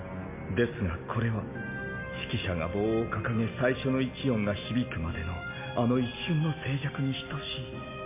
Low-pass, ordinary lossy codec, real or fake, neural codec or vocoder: 3.6 kHz; MP3, 24 kbps; real; none